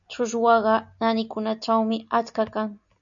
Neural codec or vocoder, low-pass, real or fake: none; 7.2 kHz; real